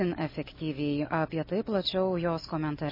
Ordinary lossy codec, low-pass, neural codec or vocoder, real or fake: MP3, 24 kbps; 5.4 kHz; none; real